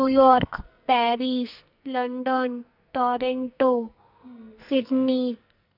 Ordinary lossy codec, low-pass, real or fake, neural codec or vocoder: none; 5.4 kHz; fake; codec, 44.1 kHz, 2.6 kbps, SNAC